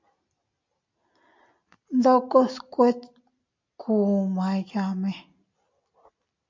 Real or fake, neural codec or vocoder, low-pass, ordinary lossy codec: real; none; 7.2 kHz; MP3, 48 kbps